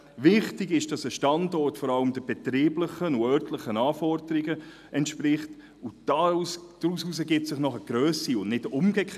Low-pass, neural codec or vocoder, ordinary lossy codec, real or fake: 14.4 kHz; none; none; real